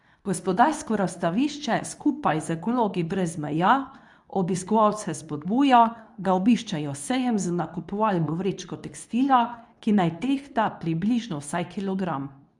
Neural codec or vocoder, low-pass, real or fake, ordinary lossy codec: codec, 24 kHz, 0.9 kbps, WavTokenizer, medium speech release version 2; 10.8 kHz; fake; none